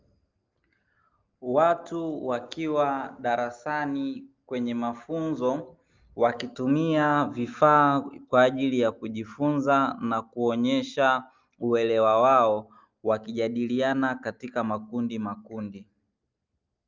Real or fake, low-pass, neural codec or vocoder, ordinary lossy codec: real; 7.2 kHz; none; Opus, 24 kbps